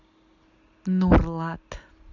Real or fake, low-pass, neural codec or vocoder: real; 7.2 kHz; none